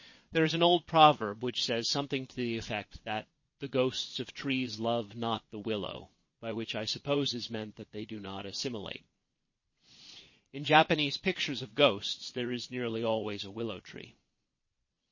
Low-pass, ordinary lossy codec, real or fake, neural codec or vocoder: 7.2 kHz; MP3, 32 kbps; fake; vocoder, 22.05 kHz, 80 mel bands, Vocos